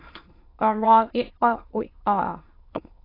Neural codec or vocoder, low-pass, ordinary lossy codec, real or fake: autoencoder, 22.05 kHz, a latent of 192 numbers a frame, VITS, trained on many speakers; 5.4 kHz; AAC, 24 kbps; fake